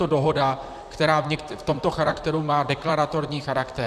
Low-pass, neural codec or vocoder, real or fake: 14.4 kHz; vocoder, 44.1 kHz, 128 mel bands, Pupu-Vocoder; fake